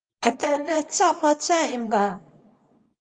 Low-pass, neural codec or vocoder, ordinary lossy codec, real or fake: 9.9 kHz; codec, 24 kHz, 0.9 kbps, WavTokenizer, small release; Opus, 32 kbps; fake